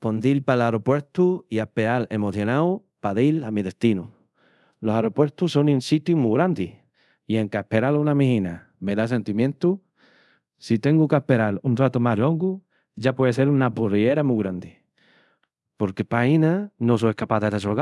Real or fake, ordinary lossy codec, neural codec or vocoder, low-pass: fake; none; codec, 24 kHz, 0.5 kbps, DualCodec; none